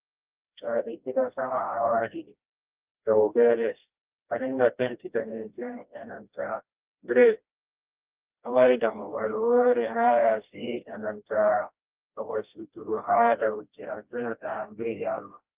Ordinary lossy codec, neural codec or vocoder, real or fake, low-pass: Opus, 24 kbps; codec, 16 kHz, 1 kbps, FreqCodec, smaller model; fake; 3.6 kHz